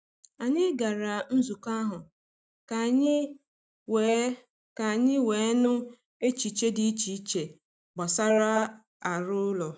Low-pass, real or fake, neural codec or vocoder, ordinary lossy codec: none; real; none; none